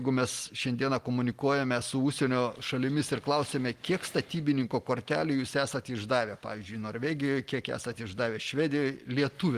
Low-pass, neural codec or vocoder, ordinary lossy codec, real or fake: 14.4 kHz; none; Opus, 24 kbps; real